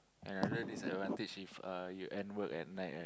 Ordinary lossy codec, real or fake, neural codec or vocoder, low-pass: none; real; none; none